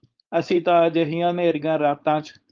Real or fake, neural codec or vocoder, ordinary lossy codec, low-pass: fake; codec, 16 kHz, 4.8 kbps, FACodec; Opus, 24 kbps; 7.2 kHz